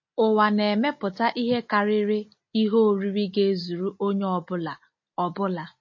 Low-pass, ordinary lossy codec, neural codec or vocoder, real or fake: 7.2 kHz; MP3, 32 kbps; none; real